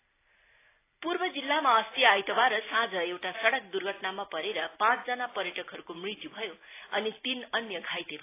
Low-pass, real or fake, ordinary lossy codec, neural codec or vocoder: 3.6 kHz; real; AAC, 24 kbps; none